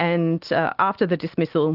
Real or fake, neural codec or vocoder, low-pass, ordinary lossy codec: real; none; 5.4 kHz; Opus, 16 kbps